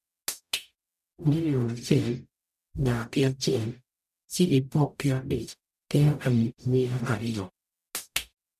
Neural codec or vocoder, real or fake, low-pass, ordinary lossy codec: codec, 44.1 kHz, 0.9 kbps, DAC; fake; 14.4 kHz; none